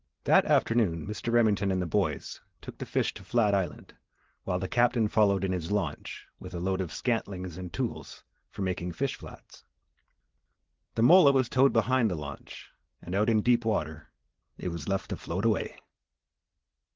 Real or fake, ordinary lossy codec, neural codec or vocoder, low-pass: real; Opus, 16 kbps; none; 7.2 kHz